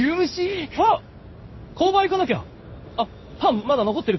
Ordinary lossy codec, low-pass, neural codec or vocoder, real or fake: MP3, 24 kbps; 7.2 kHz; codec, 16 kHz in and 24 kHz out, 1 kbps, XY-Tokenizer; fake